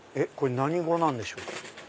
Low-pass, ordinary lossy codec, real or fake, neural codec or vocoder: none; none; real; none